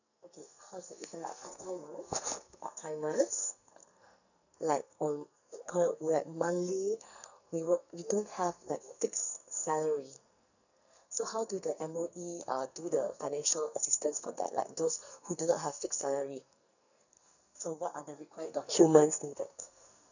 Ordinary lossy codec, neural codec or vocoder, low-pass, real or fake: none; codec, 44.1 kHz, 2.6 kbps, SNAC; 7.2 kHz; fake